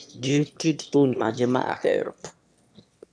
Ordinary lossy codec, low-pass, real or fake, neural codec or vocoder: none; none; fake; autoencoder, 22.05 kHz, a latent of 192 numbers a frame, VITS, trained on one speaker